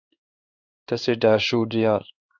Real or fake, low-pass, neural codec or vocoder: fake; 7.2 kHz; codec, 16 kHz in and 24 kHz out, 1 kbps, XY-Tokenizer